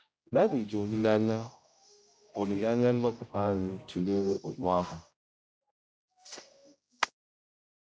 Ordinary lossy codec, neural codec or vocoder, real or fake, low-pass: none; codec, 16 kHz, 0.5 kbps, X-Codec, HuBERT features, trained on general audio; fake; none